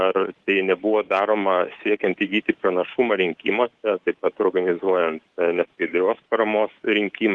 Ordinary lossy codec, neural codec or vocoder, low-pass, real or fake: Opus, 16 kbps; codec, 24 kHz, 3.1 kbps, DualCodec; 10.8 kHz; fake